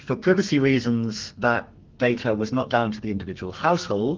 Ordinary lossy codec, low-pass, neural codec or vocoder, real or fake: Opus, 32 kbps; 7.2 kHz; codec, 32 kHz, 1.9 kbps, SNAC; fake